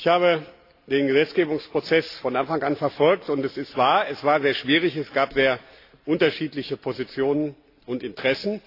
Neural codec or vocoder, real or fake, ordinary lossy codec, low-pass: none; real; AAC, 32 kbps; 5.4 kHz